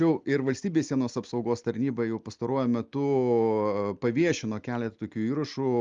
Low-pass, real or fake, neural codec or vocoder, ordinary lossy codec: 7.2 kHz; real; none; Opus, 32 kbps